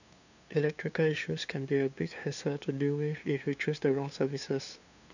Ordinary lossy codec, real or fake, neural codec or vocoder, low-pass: none; fake; codec, 16 kHz, 2 kbps, FunCodec, trained on LibriTTS, 25 frames a second; 7.2 kHz